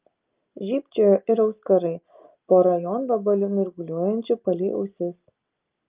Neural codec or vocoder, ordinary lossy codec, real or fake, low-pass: none; Opus, 24 kbps; real; 3.6 kHz